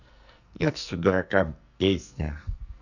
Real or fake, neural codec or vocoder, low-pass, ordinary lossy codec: fake; codec, 44.1 kHz, 2.6 kbps, SNAC; 7.2 kHz; none